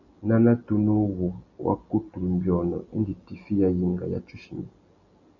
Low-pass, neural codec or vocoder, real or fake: 7.2 kHz; none; real